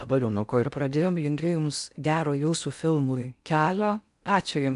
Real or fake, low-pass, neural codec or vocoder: fake; 10.8 kHz; codec, 16 kHz in and 24 kHz out, 0.6 kbps, FocalCodec, streaming, 4096 codes